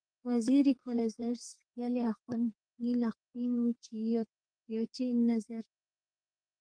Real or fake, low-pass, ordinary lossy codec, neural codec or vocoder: fake; 9.9 kHz; Opus, 24 kbps; codec, 32 kHz, 1.9 kbps, SNAC